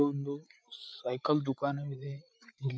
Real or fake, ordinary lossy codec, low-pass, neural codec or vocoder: fake; none; none; codec, 16 kHz, 8 kbps, FreqCodec, larger model